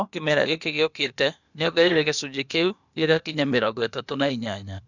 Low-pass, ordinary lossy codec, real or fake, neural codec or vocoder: 7.2 kHz; none; fake; codec, 16 kHz, 0.8 kbps, ZipCodec